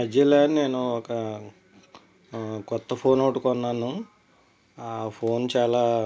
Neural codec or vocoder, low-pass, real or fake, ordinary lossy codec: none; none; real; none